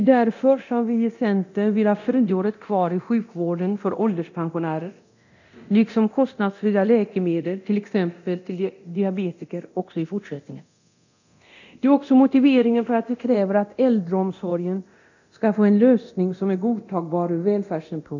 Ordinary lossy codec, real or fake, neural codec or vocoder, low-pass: none; fake; codec, 24 kHz, 0.9 kbps, DualCodec; 7.2 kHz